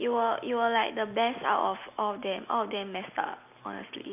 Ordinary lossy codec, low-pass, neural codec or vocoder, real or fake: none; 3.6 kHz; none; real